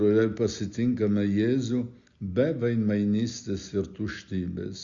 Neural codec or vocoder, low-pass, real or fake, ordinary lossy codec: none; 7.2 kHz; real; Opus, 64 kbps